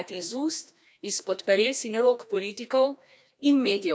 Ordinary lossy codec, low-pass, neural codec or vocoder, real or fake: none; none; codec, 16 kHz, 1 kbps, FreqCodec, larger model; fake